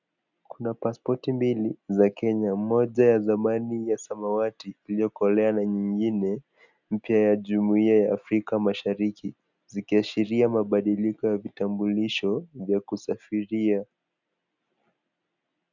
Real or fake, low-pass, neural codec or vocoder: real; 7.2 kHz; none